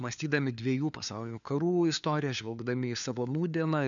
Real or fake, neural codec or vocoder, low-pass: fake; codec, 16 kHz, 4 kbps, FunCodec, trained on LibriTTS, 50 frames a second; 7.2 kHz